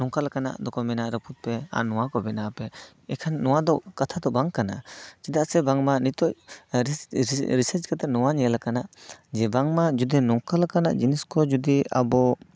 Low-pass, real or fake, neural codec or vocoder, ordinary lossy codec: none; real; none; none